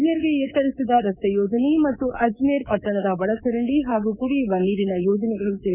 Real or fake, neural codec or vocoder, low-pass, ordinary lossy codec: fake; codec, 24 kHz, 3.1 kbps, DualCodec; 3.6 kHz; none